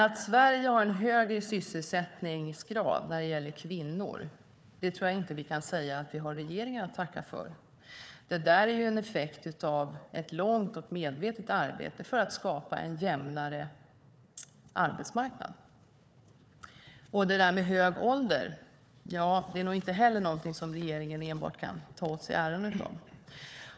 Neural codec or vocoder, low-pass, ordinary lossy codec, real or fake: codec, 16 kHz, 4 kbps, FunCodec, trained on Chinese and English, 50 frames a second; none; none; fake